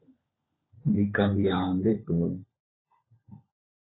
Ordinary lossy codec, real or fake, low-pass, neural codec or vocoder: AAC, 16 kbps; fake; 7.2 kHz; codec, 16 kHz, 16 kbps, FunCodec, trained on LibriTTS, 50 frames a second